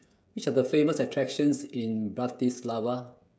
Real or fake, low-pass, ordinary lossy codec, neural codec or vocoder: fake; none; none; codec, 16 kHz, 16 kbps, FreqCodec, smaller model